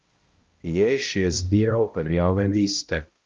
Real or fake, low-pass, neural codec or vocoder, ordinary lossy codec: fake; 7.2 kHz; codec, 16 kHz, 0.5 kbps, X-Codec, HuBERT features, trained on balanced general audio; Opus, 32 kbps